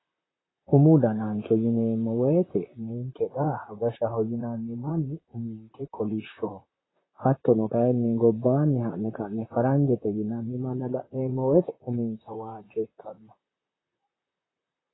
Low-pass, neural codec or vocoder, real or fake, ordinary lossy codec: 7.2 kHz; vocoder, 44.1 kHz, 128 mel bands, Pupu-Vocoder; fake; AAC, 16 kbps